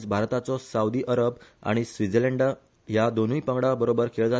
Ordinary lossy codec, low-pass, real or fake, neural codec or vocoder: none; none; real; none